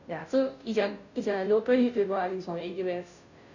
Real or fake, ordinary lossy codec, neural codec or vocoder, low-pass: fake; none; codec, 16 kHz, 0.5 kbps, FunCodec, trained on Chinese and English, 25 frames a second; 7.2 kHz